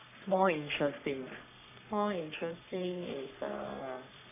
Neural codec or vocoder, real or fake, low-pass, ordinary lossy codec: codec, 44.1 kHz, 3.4 kbps, Pupu-Codec; fake; 3.6 kHz; none